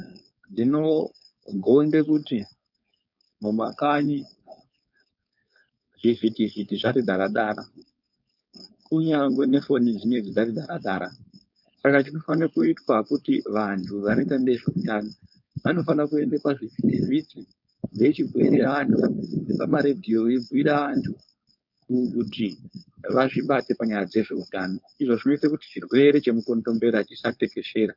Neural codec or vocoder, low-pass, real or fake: codec, 16 kHz, 4.8 kbps, FACodec; 5.4 kHz; fake